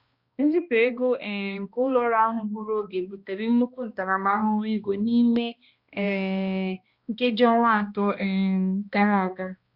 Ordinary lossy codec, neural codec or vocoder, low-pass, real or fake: Opus, 64 kbps; codec, 16 kHz, 1 kbps, X-Codec, HuBERT features, trained on balanced general audio; 5.4 kHz; fake